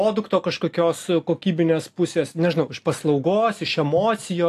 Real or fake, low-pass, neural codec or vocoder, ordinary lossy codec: real; 14.4 kHz; none; AAC, 64 kbps